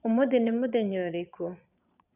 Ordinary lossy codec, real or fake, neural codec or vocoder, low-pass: none; fake; vocoder, 22.05 kHz, 80 mel bands, Vocos; 3.6 kHz